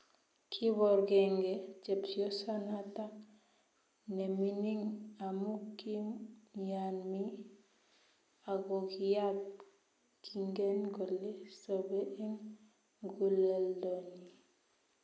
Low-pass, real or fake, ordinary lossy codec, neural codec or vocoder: none; real; none; none